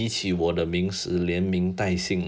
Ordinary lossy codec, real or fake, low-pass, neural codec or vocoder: none; real; none; none